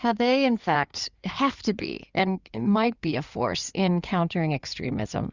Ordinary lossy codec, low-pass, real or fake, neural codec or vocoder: Opus, 64 kbps; 7.2 kHz; fake; codec, 16 kHz in and 24 kHz out, 2.2 kbps, FireRedTTS-2 codec